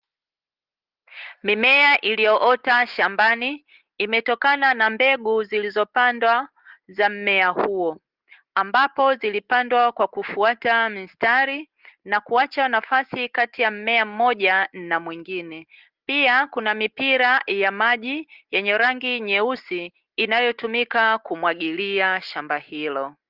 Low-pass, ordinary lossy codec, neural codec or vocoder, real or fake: 5.4 kHz; Opus, 32 kbps; none; real